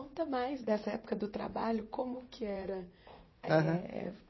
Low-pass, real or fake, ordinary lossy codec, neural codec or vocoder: 7.2 kHz; fake; MP3, 24 kbps; vocoder, 44.1 kHz, 80 mel bands, Vocos